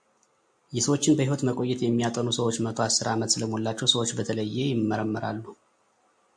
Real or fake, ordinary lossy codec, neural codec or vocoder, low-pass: real; MP3, 64 kbps; none; 9.9 kHz